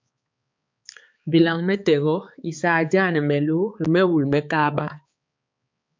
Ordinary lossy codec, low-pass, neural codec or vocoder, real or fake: MP3, 64 kbps; 7.2 kHz; codec, 16 kHz, 4 kbps, X-Codec, HuBERT features, trained on balanced general audio; fake